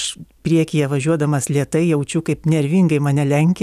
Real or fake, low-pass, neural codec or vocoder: real; 14.4 kHz; none